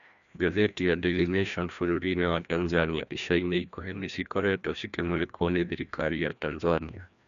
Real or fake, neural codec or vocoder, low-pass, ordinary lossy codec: fake; codec, 16 kHz, 1 kbps, FreqCodec, larger model; 7.2 kHz; none